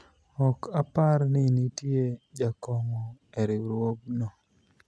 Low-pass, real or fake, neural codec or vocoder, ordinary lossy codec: none; real; none; none